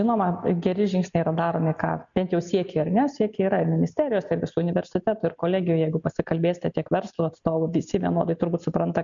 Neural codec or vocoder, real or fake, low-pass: none; real; 7.2 kHz